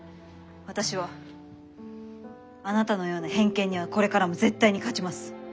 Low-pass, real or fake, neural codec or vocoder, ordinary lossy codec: none; real; none; none